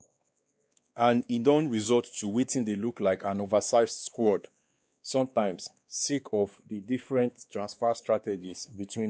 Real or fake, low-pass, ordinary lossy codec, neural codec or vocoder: fake; none; none; codec, 16 kHz, 2 kbps, X-Codec, WavLM features, trained on Multilingual LibriSpeech